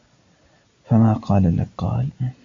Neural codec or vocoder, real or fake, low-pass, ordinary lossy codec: none; real; 7.2 kHz; AAC, 48 kbps